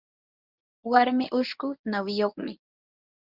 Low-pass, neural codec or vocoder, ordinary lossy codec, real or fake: 5.4 kHz; codec, 24 kHz, 0.9 kbps, WavTokenizer, medium speech release version 1; Opus, 64 kbps; fake